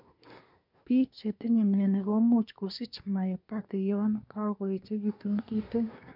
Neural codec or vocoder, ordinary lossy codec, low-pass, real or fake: codec, 24 kHz, 1 kbps, SNAC; none; 5.4 kHz; fake